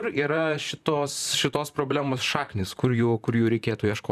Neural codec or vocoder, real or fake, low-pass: vocoder, 44.1 kHz, 128 mel bands, Pupu-Vocoder; fake; 14.4 kHz